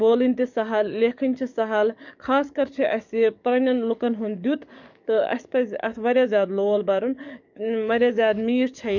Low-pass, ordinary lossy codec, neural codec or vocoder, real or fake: 7.2 kHz; none; codec, 44.1 kHz, 7.8 kbps, DAC; fake